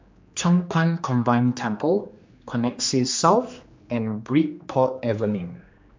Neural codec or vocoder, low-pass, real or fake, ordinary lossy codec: codec, 16 kHz, 2 kbps, X-Codec, HuBERT features, trained on general audio; 7.2 kHz; fake; MP3, 48 kbps